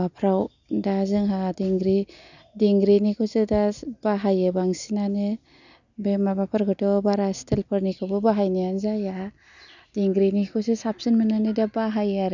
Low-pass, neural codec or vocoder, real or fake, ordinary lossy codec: 7.2 kHz; none; real; none